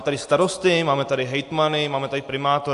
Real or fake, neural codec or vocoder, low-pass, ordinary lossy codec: real; none; 10.8 kHz; AAC, 64 kbps